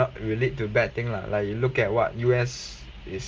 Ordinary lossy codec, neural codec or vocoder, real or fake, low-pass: Opus, 24 kbps; none; real; 7.2 kHz